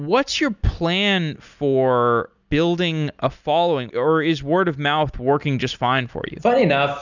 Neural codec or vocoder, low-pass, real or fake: none; 7.2 kHz; real